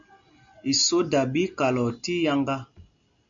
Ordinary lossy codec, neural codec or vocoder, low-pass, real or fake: MP3, 64 kbps; none; 7.2 kHz; real